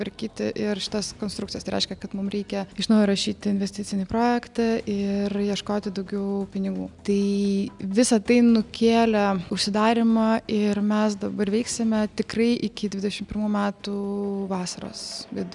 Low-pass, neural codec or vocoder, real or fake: 10.8 kHz; none; real